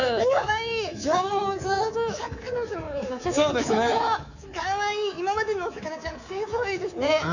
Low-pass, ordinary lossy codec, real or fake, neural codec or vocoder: 7.2 kHz; none; fake; codec, 24 kHz, 3.1 kbps, DualCodec